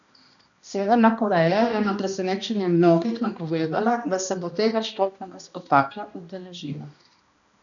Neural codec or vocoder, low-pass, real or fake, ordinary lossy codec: codec, 16 kHz, 1 kbps, X-Codec, HuBERT features, trained on balanced general audio; 7.2 kHz; fake; none